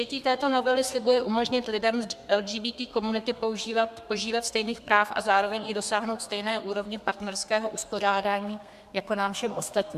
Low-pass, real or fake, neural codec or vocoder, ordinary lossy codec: 14.4 kHz; fake; codec, 32 kHz, 1.9 kbps, SNAC; MP3, 96 kbps